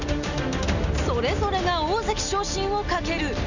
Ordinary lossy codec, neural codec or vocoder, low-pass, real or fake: none; none; 7.2 kHz; real